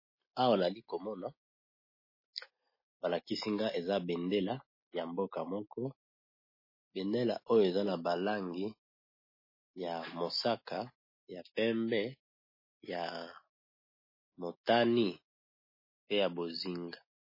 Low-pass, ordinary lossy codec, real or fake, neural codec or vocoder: 5.4 kHz; MP3, 24 kbps; real; none